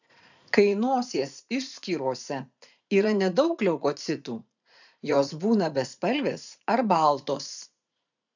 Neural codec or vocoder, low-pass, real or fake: vocoder, 44.1 kHz, 80 mel bands, Vocos; 7.2 kHz; fake